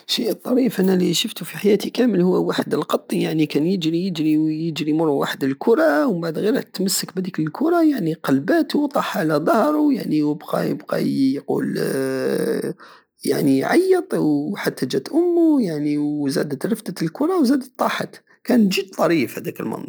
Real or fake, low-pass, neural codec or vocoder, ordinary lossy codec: real; none; none; none